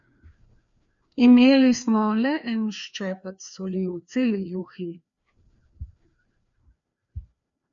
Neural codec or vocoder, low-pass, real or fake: codec, 16 kHz, 2 kbps, FreqCodec, larger model; 7.2 kHz; fake